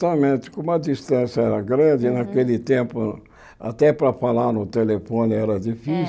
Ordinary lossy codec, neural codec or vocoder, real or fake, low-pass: none; none; real; none